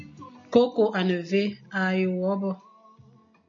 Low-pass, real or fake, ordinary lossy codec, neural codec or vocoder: 7.2 kHz; real; AAC, 64 kbps; none